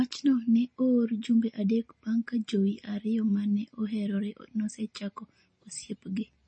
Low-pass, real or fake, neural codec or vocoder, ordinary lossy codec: 9.9 kHz; real; none; MP3, 32 kbps